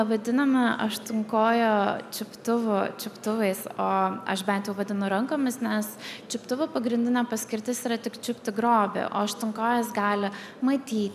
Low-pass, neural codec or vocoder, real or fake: 14.4 kHz; none; real